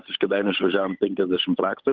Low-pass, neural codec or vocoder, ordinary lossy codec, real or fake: 7.2 kHz; codec, 16 kHz, 8 kbps, FunCodec, trained on Chinese and English, 25 frames a second; Opus, 32 kbps; fake